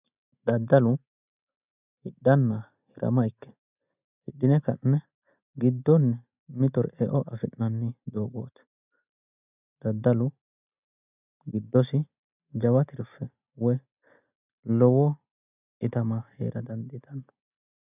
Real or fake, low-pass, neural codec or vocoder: real; 3.6 kHz; none